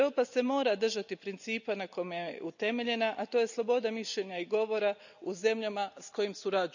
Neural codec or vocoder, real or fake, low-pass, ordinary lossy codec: none; real; 7.2 kHz; none